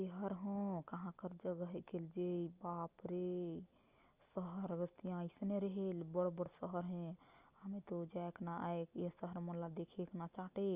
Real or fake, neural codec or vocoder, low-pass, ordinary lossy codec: real; none; 3.6 kHz; Opus, 32 kbps